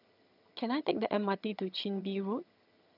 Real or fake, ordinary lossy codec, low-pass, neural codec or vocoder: fake; none; 5.4 kHz; vocoder, 22.05 kHz, 80 mel bands, HiFi-GAN